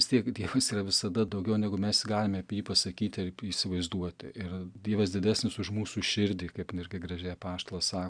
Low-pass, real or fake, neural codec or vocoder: 9.9 kHz; real; none